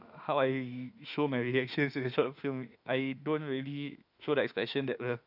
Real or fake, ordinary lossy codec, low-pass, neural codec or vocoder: fake; none; 5.4 kHz; autoencoder, 48 kHz, 32 numbers a frame, DAC-VAE, trained on Japanese speech